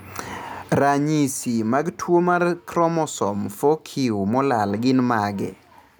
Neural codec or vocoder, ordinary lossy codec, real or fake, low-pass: none; none; real; none